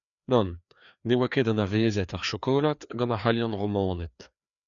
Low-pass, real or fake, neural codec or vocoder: 7.2 kHz; fake; codec, 16 kHz, 2 kbps, FreqCodec, larger model